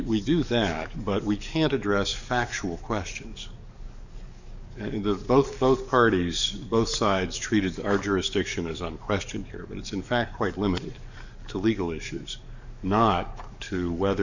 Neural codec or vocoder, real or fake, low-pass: codec, 44.1 kHz, 7.8 kbps, DAC; fake; 7.2 kHz